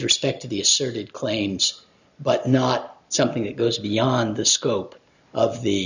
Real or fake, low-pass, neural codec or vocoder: real; 7.2 kHz; none